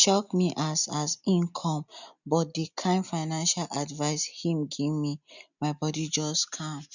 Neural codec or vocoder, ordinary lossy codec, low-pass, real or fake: none; none; 7.2 kHz; real